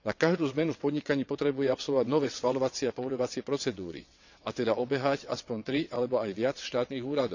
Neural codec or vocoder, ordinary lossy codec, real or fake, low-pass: vocoder, 22.05 kHz, 80 mel bands, WaveNeXt; none; fake; 7.2 kHz